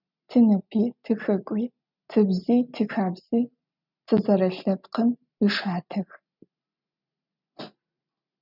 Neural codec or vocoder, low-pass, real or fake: none; 5.4 kHz; real